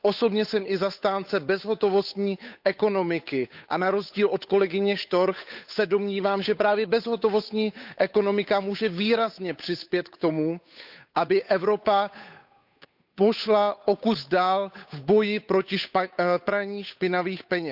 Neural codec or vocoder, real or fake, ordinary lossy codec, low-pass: codec, 16 kHz, 8 kbps, FunCodec, trained on Chinese and English, 25 frames a second; fake; none; 5.4 kHz